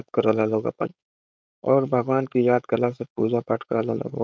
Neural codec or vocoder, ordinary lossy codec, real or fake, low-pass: codec, 16 kHz, 4.8 kbps, FACodec; none; fake; none